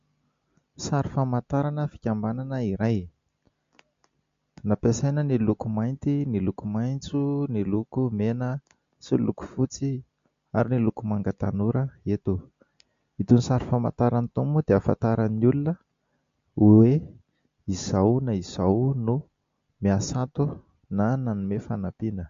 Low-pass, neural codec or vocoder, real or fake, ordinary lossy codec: 7.2 kHz; none; real; MP3, 48 kbps